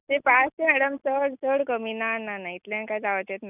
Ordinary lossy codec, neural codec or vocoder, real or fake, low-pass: none; none; real; 3.6 kHz